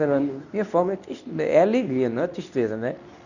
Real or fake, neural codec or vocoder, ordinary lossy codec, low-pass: fake; codec, 24 kHz, 0.9 kbps, WavTokenizer, medium speech release version 2; none; 7.2 kHz